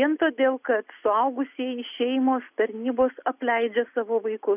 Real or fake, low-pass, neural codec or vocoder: real; 3.6 kHz; none